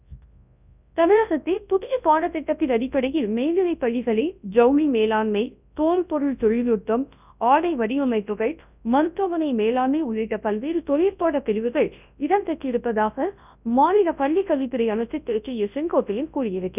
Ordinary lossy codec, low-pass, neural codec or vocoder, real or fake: none; 3.6 kHz; codec, 24 kHz, 0.9 kbps, WavTokenizer, large speech release; fake